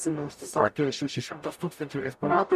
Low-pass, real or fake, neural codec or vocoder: 14.4 kHz; fake; codec, 44.1 kHz, 0.9 kbps, DAC